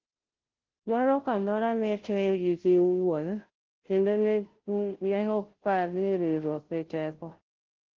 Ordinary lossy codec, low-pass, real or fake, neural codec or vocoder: Opus, 16 kbps; 7.2 kHz; fake; codec, 16 kHz, 0.5 kbps, FunCodec, trained on Chinese and English, 25 frames a second